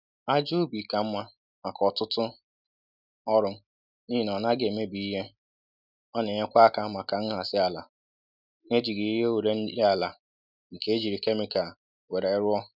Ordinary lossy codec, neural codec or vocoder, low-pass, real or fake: none; none; 5.4 kHz; real